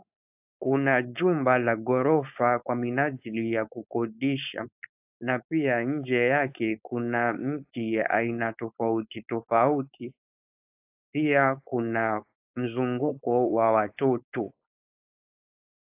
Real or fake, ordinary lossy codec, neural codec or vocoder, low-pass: fake; AAC, 32 kbps; codec, 16 kHz, 4.8 kbps, FACodec; 3.6 kHz